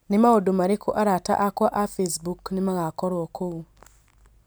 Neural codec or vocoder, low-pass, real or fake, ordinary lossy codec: none; none; real; none